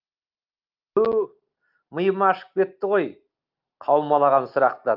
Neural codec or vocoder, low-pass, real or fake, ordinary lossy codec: none; 5.4 kHz; real; Opus, 32 kbps